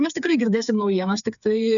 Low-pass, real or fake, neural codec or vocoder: 7.2 kHz; fake; codec, 16 kHz, 4 kbps, FreqCodec, smaller model